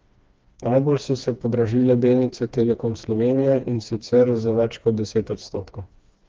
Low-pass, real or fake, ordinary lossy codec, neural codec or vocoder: 7.2 kHz; fake; Opus, 24 kbps; codec, 16 kHz, 2 kbps, FreqCodec, smaller model